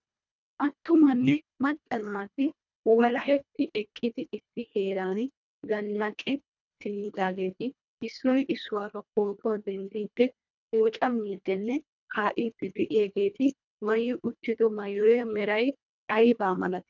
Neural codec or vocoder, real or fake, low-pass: codec, 24 kHz, 1.5 kbps, HILCodec; fake; 7.2 kHz